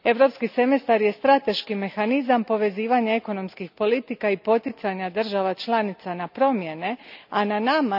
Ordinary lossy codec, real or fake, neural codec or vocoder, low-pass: none; real; none; 5.4 kHz